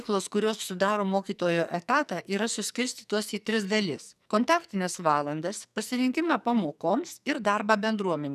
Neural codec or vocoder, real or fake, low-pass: codec, 44.1 kHz, 2.6 kbps, SNAC; fake; 14.4 kHz